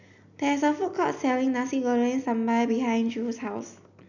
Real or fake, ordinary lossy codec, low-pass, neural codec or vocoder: real; none; 7.2 kHz; none